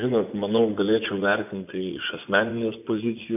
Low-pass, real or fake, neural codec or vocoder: 3.6 kHz; fake; vocoder, 24 kHz, 100 mel bands, Vocos